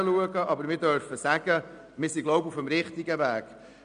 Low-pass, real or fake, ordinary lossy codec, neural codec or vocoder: 9.9 kHz; real; MP3, 96 kbps; none